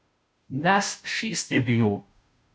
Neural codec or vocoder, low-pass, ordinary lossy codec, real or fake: codec, 16 kHz, 0.5 kbps, FunCodec, trained on Chinese and English, 25 frames a second; none; none; fake